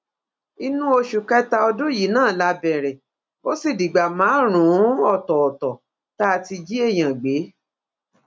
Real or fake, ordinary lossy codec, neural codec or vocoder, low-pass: real; none; none; none